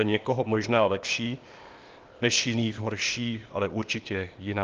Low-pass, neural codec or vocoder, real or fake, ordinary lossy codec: 7.2 kHz; codec, 16 kHz, 0.8 kbps, ZipCodec; fake; Opus, 32 kbps